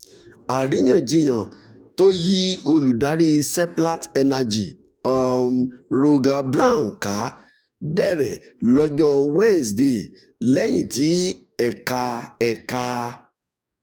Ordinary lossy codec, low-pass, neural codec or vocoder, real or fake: none; 19.8 kHz; codec, 44.1 kHz, 2.6 kbps, DAC; fake